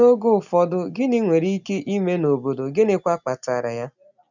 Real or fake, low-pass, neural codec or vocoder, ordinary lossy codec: real; 7.2 kHz; none; none